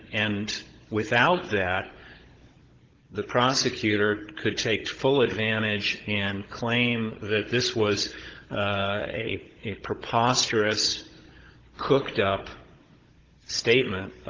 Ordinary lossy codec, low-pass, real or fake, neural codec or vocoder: Opus, 16 kbps; 7.2 kHz; fake; codec, 16 kHz, 16 kbps, FunCodec, trained on Chinese and English, 50 frames a second